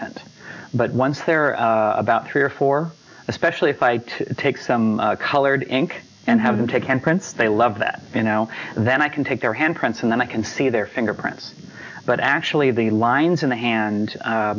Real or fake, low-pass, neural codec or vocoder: real; 7.2 kHz; none